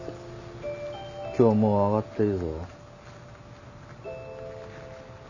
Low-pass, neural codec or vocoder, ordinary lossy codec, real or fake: 7.2 kHz; none; none; real